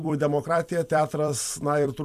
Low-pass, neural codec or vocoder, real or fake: 14.4 kHz; vocoder, 44.1 kHz, 128 mel bands every 256 samples, BigVGAN v2; fake